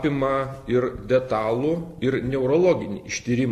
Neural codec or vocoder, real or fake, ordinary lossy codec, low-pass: none; real; AAC, 64 kbps; 14.4 kHz